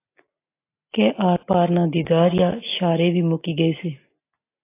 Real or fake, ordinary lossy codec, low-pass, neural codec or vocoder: real; AAC, 24 kbps; 3.6 kHz; none